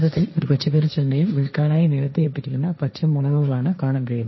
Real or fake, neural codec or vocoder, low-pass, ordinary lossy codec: fake; codec, 16 kHz, 1.1 kbps, Voila-Tokenizer; 7.2 kHz; MP3, 24 kbps